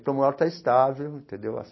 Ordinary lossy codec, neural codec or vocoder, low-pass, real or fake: MP3, 24 kbps; none; 7.2 kHz; real